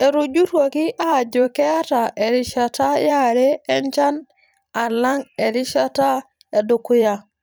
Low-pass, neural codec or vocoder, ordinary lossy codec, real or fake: none; vocoder, 44.1 kHz, 128 mel bands every 512 samples, BigVGAN v2; none; fake